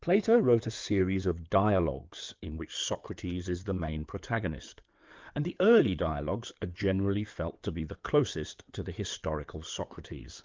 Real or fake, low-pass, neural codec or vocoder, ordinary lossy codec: fake; 7.2 kHz; codec, 16 kHz in and 24 kHz out, 2.2 kbps, FireRedTTS-2 codec; Opus, 24 kbps